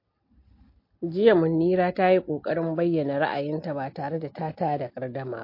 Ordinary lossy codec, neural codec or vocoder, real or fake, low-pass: MP3, 32 kbps; none; real; 5.4 kHz